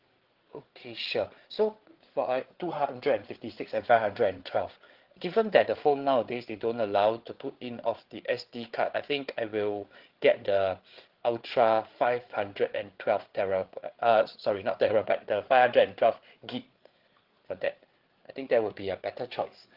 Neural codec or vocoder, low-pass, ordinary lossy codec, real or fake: codec, 16 kHz, 8 kbps, FreqCodec, larger model; 5.4 kHz; Opus, 16 kbps; fake